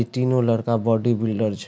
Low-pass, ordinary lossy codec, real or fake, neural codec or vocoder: none; none; real; none